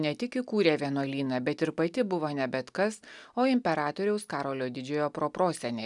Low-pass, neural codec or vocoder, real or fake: 10.8 kHz; none; real